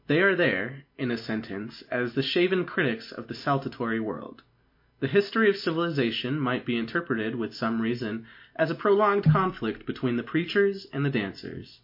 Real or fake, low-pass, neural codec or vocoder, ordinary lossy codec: real; 5.4 kHz; none; MP3, 32 kbps